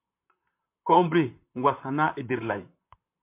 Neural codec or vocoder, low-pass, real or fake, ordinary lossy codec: vocoder, 44.1 kHz, 128 mel bands, Pupu-Vocoder; 3.6 kHz; fake; MP3, 32 kbps